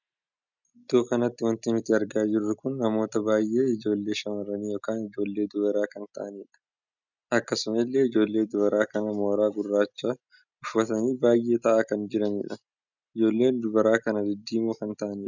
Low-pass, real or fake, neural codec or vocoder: 7.2 kHz; real; none